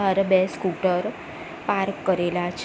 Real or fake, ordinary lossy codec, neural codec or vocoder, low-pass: real; none; none; none